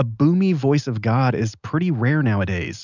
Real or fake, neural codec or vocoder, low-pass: real; none; 7.2 kHz